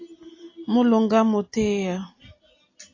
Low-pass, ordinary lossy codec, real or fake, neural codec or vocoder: 7.2 kHz; AAC, 32 kbps; real; none